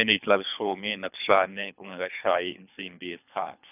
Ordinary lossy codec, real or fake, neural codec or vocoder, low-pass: none; fake; codec, 24 kHz, 3 kbps, HILCodec; 3.6 kHz